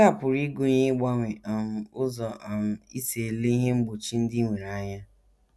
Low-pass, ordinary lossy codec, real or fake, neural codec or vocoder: none; none; real; none